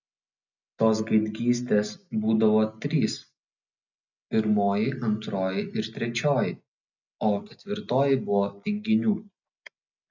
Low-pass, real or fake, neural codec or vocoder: 7.2 kHz; real; none